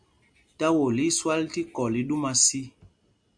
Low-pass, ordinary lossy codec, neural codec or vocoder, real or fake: 9.9 kHz; MP3, 64 kbps; none; real